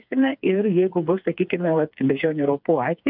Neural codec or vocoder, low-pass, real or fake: codec, 16 kHz, 4 kbps, FreqCodec, smaller model; 5.4 kHz; fake